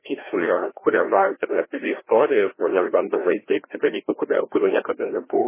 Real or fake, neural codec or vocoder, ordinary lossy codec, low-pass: fake; codec, 16 kHz, 1 kbps, FreqCodec, larger model; MP3, 16 kbps; 3.6 kHz